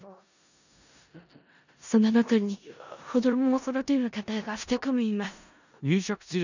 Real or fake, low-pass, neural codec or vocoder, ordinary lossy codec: fake; 7.2 kHz; codec, 16 kHz in and 24 kHz out, 0.4 kbps, LongCat-Audio-Codec, four codebook decoder; none